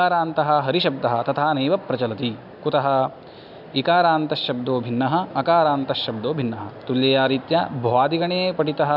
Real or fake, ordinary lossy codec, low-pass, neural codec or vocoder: real; none; 5.4 kHz; none